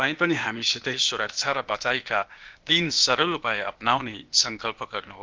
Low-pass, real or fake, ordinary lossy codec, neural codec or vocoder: 7.2 kHz; fake; Opus, 24 kbps; codec, 16 kHz, 0.8 kbps, ZipCodec